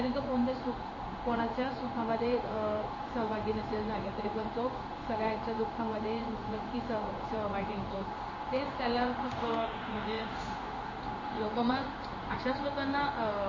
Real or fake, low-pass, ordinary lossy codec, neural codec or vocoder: fake; 7.2 kHz; MP3, 32 kbps; codec, 16 kHz in and 24 kHz out, 1 kbps, XY-Tokenizer